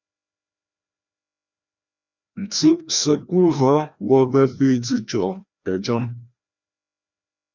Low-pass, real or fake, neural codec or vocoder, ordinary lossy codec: 7.2 kHz; fake; codec, 16 kHz, 1 kbps, FreqCodec, larger model; Opus, 64 kbps